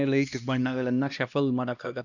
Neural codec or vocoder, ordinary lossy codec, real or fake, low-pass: codec, 16 kHz, 1 kbps, X-Codec, HuBERT features, trained on LibriSpeech; none; fake; 7.2 kHz